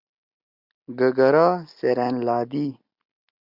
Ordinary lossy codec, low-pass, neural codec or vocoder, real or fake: Opus, 64 kbps; 5.4 kHz; none; real